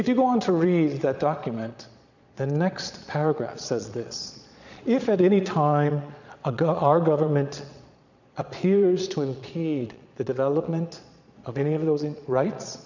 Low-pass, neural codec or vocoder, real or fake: 7.2 kHz; vocoder, 22.05 kHz, 80 mel bands, Vocos; fake